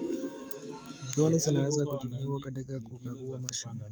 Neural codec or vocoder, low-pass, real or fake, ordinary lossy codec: codec, 44.1 kHz, 7.8 kbps, DAC; none; fake; none